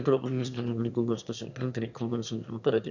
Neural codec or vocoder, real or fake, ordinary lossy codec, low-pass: autoencoder, 22.05 kHz, a latent of 192 numbers a frame, VITS, trained on one speaker; fake; none; 7.2 kHz